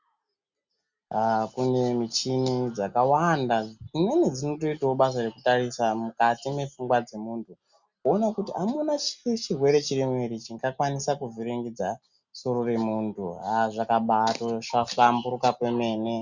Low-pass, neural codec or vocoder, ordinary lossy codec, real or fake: 7.2 kHz; none; Opus, 64 kbps; real